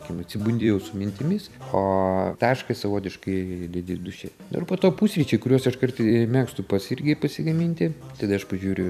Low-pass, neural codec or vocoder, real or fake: 14.4 kHz; vocoder, 44.1 kHz, 128 mel bands every 256 samples, BigVGAN v2; fake